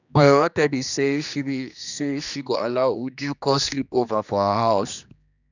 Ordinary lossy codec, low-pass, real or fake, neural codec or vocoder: none; 7.2 kHz; fake; codec, 16 kHz, 2 kbps, X-Codec, HuBERT features, trained on general audio